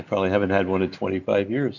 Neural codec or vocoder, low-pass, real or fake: none; 7.2 kHz; real